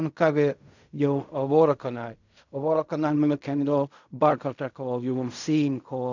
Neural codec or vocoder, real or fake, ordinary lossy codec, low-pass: codec, 16 kHz in and 24 kHz out, 0.4 kbps, LongCat-Audio-Codec, fine tuned four codebook decoder; fake; none; 7.2 kHz